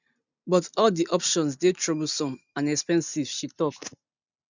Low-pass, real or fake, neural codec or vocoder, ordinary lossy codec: 7.2 kHz; real; none; none